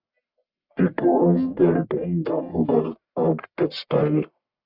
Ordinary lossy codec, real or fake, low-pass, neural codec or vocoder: Opus, 64 kbps; fake; 5.4 kHz; codec, 44.1 kHz, 1.7 kbps, Pupu-Codec